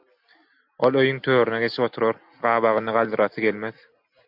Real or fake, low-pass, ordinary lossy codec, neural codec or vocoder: real; 5.4 kHz; MP3, 48 kbps; none